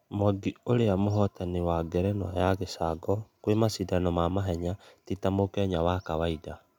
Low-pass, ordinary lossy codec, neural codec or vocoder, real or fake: 19.8 kHz; none; none; real